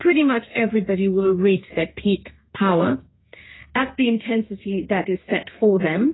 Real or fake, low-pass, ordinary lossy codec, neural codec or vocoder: fake; 7.2 kHz; AAC, 16 kbps; codec, 32 kHz, 1.9 kbps, SNAC